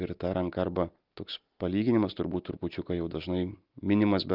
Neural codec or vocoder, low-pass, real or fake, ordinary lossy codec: none; 5.4 kHz; real; Opus, 32 kbps